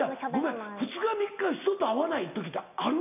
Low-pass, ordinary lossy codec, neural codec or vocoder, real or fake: 3.6 kHz; none; none; real